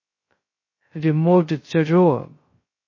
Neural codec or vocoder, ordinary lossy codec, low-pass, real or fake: codec, 16 kHz, 0.2 kbps, FocalCodec; MP3, 32 kbps; 7.2 kHz; fake